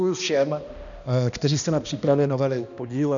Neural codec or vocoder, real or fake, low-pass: codec, 16 kHz, 1 kbps, X-Codec, HuBERT features, trained on balanced general audio; fake; 7.2 kHz